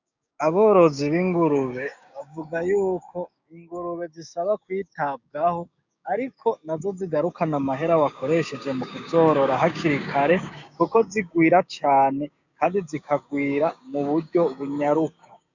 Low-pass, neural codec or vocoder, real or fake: 7.2 kHz; codec, 16 kHz, 6 kbps, DAC; fake